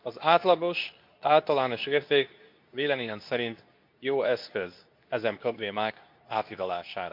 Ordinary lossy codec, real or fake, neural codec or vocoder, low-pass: MP3, 48 kbps; fake; codec, 24 kHz, 0.9 kbps, WavTokenizer, medium speech release version 2; 5.4 kHz